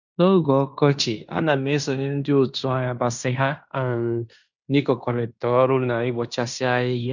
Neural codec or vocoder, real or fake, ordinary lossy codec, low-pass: codec, 16 kHz in and 24 kHz out, 0.9 kbps, LongCat-Audio-Codec, fine tuned four codebook decoder; fake; none; 7.2 kHz